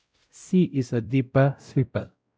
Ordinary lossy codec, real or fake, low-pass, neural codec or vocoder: none; fake; none; codec, 16 kHz, 0.5 kbps, X-Codec, WavLM features, trained on Multilingual LibriSpeech